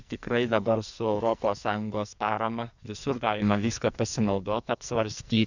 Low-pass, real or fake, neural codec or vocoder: 7.2 kHz; fake; codec, 44.1 kHz, 2.6 kbps, SNAC